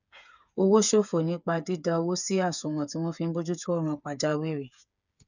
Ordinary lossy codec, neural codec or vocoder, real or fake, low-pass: none; codec, 16 kHz, 8 kbps, FreqCodec, smaller model; fake; 7.2 kHz